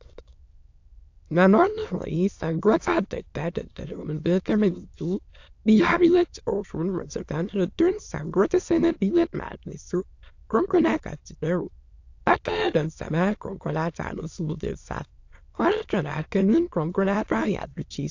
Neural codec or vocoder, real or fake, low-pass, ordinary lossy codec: autoencoder, 22.05 kHz, a latent of 192 numbers a frame, VITS, trained on many speakers; fake; 7.2 kHz; AAC, 48 kbps